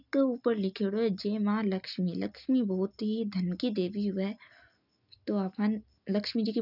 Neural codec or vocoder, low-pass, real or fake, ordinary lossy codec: none; 5.4 kHz; real; none